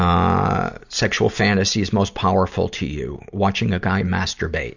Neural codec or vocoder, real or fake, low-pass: none; real; 7.2 kHz